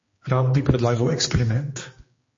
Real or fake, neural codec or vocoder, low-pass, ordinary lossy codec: fake; codec, 16 kHz, 2 kbps, X-Codec, HuBERT features, trained on general audio; 7.2 kHz; MP3, 32 kbps